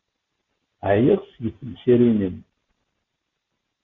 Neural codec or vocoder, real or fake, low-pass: none; real; 7.2 kHz